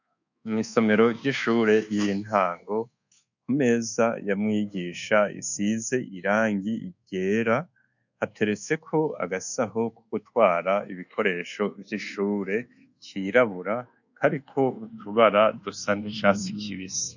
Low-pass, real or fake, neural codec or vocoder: 7.2 kHz; fake; codec, 24 kHz, 1.2 kbps, DualCodec